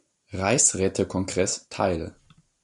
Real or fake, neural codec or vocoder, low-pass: real; none; 10.8 kHz